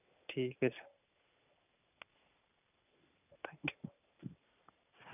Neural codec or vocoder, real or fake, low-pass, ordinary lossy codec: none; real; 3.6 kHz; none